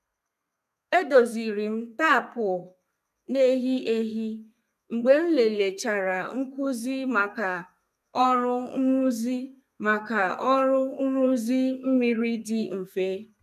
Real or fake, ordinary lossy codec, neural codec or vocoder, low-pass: fake; none; codec, 32 kHz, 1.9 kbps, SNAC; 14.4 kHz